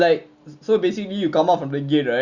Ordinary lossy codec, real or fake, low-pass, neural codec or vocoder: Opus, 64 kbps; real; 7.2 kHz; none